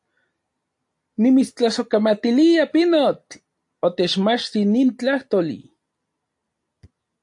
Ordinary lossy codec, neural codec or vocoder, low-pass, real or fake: AAC, 64 kbps; none; 10.8 kHz; real